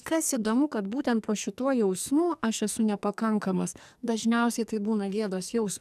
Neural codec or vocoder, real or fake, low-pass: codec, 44.1 kHz, 2.6 kbps, SNAC; fake; 14.4 kHz